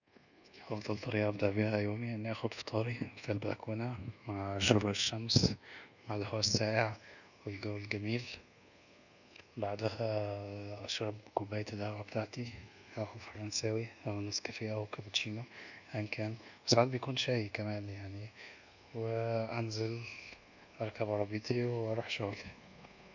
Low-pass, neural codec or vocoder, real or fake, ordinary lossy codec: 7.2 kHz; codec, 24 kHz, 1.2 kbps, DualCodec; fake; none